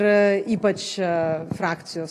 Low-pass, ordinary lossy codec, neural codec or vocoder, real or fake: 14.4 kHz; AAC, 48 kbps; none; real